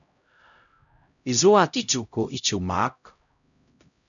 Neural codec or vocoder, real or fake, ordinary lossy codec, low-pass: codec, 16 kHz, 0.5 kbps, X-Codec, HuBERT features, trained on LibriSpeech; fake; MP3, 96 kbps; 7.2 kHz